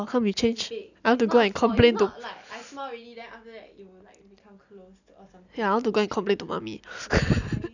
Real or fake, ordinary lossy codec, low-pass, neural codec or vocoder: real; none; 7.2 kHz; none